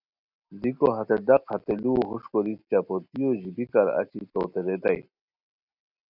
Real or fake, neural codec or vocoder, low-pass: real; none; 5.4 kHz